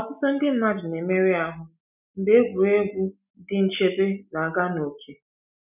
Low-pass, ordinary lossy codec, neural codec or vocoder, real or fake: 3.6 kHz; none; none; real